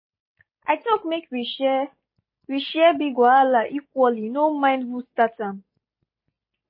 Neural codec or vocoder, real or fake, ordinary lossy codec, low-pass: none; real; MP3, 24 kbps; 5.4 kHz